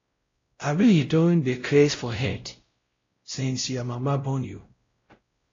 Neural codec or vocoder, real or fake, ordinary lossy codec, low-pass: codec, 16 kHz, 0.5 kbps, X-Codec, WavLM features, trained on Multilingual LibriSpeech; fake; AAC, 32 kbps; 7.2 kHz